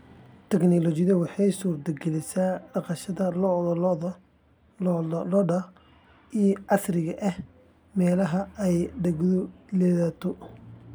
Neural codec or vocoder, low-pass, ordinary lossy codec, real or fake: none; none; none; real